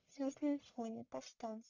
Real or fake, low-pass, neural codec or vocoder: fake; 7.2 kHz; codec, 44.1 kHz, 1.7 kbps, Pupu-Codec